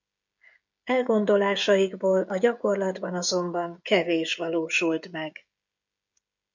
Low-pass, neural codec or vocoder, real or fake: 7.2 kHz; codec, 16 kHz, 16 kbps, FreqCodec, smaller model; fake